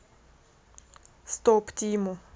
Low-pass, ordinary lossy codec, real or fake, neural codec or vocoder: none; none; real; none